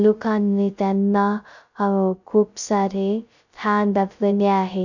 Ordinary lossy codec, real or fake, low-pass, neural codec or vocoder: none; fake; 7.2 kHz; codec, 16 kHz, 0.2 kbps, FocalCodec